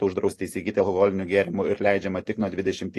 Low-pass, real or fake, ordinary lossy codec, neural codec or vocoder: 14.4 kHz; real; AAC, 48 kbps; none